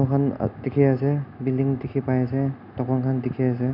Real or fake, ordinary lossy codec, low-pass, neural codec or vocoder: real; none; 5.4 kHz; none